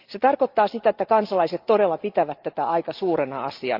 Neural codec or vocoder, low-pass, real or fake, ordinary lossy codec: none; 5.4 kHz; real; Opus, 32 kbps